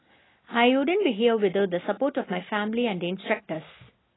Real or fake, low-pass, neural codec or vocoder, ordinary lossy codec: real; 7.2 kHz; none; AAC, 16 kbps